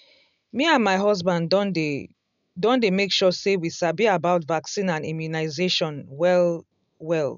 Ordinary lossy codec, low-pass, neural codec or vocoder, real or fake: none; 7.2 kHz; none; real